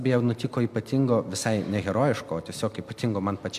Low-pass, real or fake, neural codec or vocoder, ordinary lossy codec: 14.4 kHz; real; none; MP3, 96 kbps